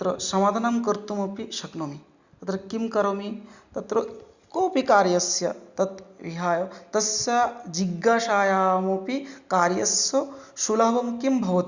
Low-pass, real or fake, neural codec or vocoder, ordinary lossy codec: 7.2 kHz; real; none; Opus, 64 kbps